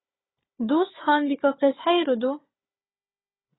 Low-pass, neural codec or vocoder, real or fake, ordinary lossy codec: 7.2 kHz; codec, 16 kHz, 4 kbps, FunCodec, trained on Chinese and English, 50 frames a second; fake; AAC, 16 kbps